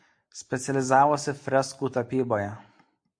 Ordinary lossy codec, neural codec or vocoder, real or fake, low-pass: AAC, 64 kbps; none; real; 9.9 kHz